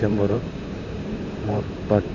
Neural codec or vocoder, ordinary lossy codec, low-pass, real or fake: vocoder, 44.1 kHz, 128 mel bands, Pupu-Vocoder; none; 7.2 kHz; fake